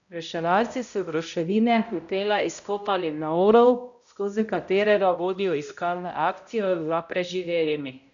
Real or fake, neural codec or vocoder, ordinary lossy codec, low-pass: fake; codec, 16 kHz, 0.5 kbps, X-Codec, HuBERT features, trained on balanced general audio; none; 7.2 kHz